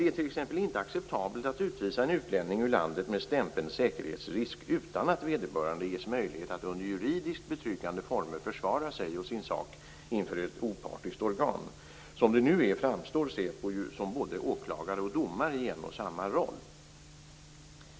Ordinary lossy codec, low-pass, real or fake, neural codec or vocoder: none; none; real; none